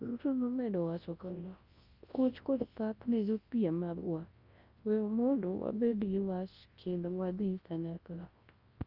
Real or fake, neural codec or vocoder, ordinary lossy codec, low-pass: fake; codec, 24 kHz, 0.9 kbps, WavTokenizer, large speech release; Opus, 24 kbps; 5.4 kHz